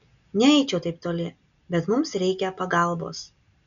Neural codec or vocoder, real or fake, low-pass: none; real; 7.2 kHz